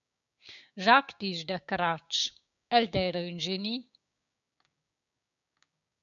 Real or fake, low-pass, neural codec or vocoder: fake; 7.2 kHz; codec, 16 kHz, 6 kbps, DAC